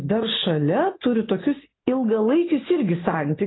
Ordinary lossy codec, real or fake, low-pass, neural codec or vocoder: AAC, 16 kbps; real; 7.2 kHz; none